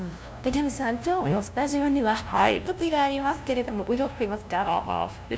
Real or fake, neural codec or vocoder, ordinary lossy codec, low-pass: fake; codec, 16 kHz, 0.5 kbps, FunCodec, trained on LibriTTS, 25 frames a second; none; none